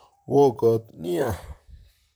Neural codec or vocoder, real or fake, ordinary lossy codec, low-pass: vocoder, 44.1 kHz, 128 mel bands, Pupu-Vocoder; fake; none; none